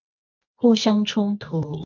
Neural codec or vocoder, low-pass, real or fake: codec, 24 kHz, 0.9 kbps, WavTokenizer, medium music audio release; 7.2 kHz; fake